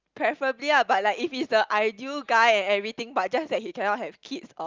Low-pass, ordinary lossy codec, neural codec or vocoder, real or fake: 7.2 kHz; Opus, 24 kbps; none; real